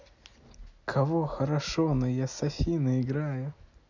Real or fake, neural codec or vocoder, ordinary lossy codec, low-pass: real; none; none; 7.2 kHz